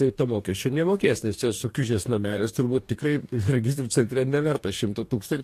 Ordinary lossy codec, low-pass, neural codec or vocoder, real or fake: AAC, 64 kbps; 14.4 kHz; codec, 44.1 kHz, 2.6 kbps, DAC; fake